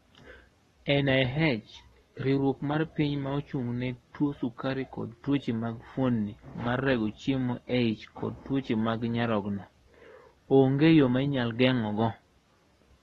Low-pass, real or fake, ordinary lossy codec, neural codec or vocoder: 19.8 kHz; fake; AAC, 32 kbps; codec, 44.1 kHz, 7.8 kbps, Pupu-Codec